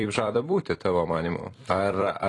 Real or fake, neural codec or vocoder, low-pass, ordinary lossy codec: fake; vocoder, 44.1 kHz, 128 mel bands every 256 samples, BigVGAN v2; 10.8 kHz; AAC, 32 kbps